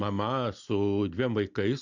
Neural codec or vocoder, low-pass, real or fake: none; 7.2 kHz; real